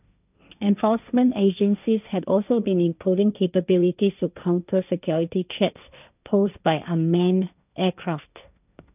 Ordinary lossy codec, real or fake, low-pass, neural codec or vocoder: none; fake; 3.6 kHz; codec, 16 kHz, 1.1 kbps, Voila-Tokenizer